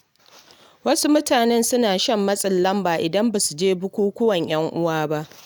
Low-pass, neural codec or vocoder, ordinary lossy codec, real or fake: none; none; none; real